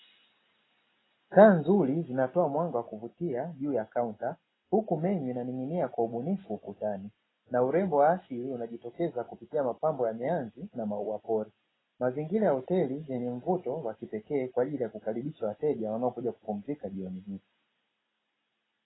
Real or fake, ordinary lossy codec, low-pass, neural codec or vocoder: real; AAC, 16 kbps; 7.2 kHz; none